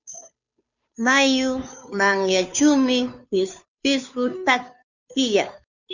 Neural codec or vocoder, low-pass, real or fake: codec, 16 kHz, 2 kbps, FunCodec, trained on Chinese and English, 25 frames a second; 7.2 kHz; fake